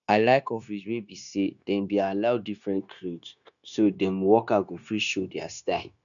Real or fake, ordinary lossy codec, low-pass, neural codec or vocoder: fake; none; 7.2 kHz; codec, 16 kHz, 0.9 kbps, LongCat-Audio-Codec